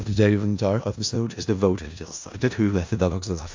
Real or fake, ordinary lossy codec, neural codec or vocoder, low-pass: fake; AAC, 48 kbps; codec, 16 kHz in and 24 kHz out, 0.4 kbps, LongCat-Audio-Codec, four codebook decoder; 7.2 kHz